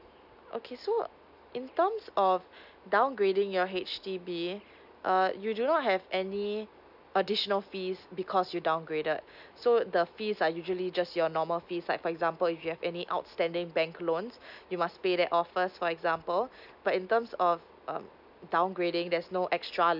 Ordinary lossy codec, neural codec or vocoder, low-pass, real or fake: none; none; 5.4 kHz; real